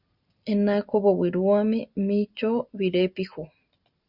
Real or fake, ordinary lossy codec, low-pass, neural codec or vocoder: real; Opus, 64 kbps; 5.4 kHz; none